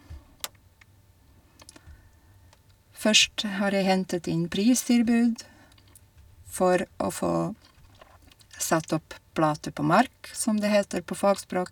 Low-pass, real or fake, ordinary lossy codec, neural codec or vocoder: 19.8 kHz; real; none; none